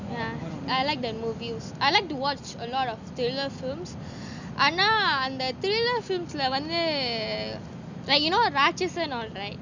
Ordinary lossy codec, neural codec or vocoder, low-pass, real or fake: none; none; 7.2 kHz; real